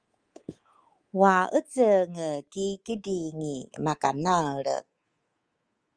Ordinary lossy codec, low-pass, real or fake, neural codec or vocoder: Opus, 24 kbps; 9.9 kHz; fake; codec, 24 kHz, 3.1 kbps, DualCodec